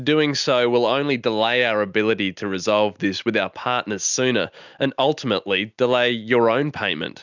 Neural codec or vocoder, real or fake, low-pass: none; real; 7.2 kHz